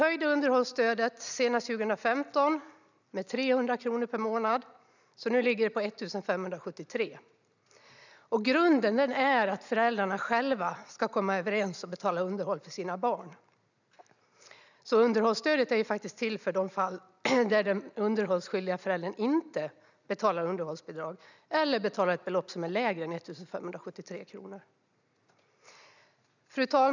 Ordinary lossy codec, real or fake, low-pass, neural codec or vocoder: none; real; 7.2 kHz; none